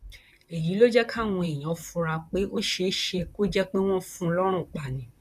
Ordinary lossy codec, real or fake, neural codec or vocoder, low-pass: none; fake; vocoder, 44.1 kHz, 128 mel bands, Pupu-Vocoder; 14.4 kHz